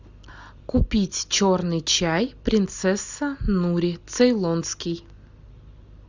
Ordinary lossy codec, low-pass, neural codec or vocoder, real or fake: Opus, 64 kbps; 7.2 kHz; none; real